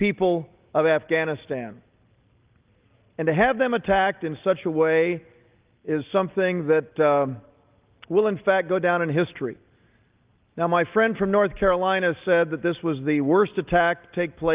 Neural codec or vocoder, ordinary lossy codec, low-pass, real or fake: none; Opus, 32 kbps; 3.6 kHz; real